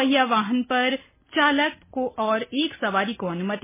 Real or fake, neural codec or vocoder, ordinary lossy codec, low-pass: real; none; MP3, 16 kbps; 3.6 kHz